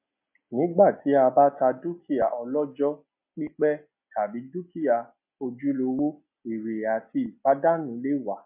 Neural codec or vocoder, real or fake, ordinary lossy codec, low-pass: none; real; MP3, 32 kbps; 3.6 kHz